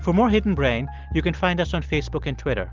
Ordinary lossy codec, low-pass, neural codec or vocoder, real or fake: Opus, 32 kbps; 7.2 kHz; none; real